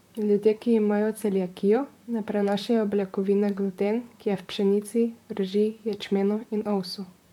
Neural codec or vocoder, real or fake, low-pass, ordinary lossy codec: vocoder, 44.1 kHz, 128 mel bands, Pupu-Vocoder; fake; 19.8 kHz; none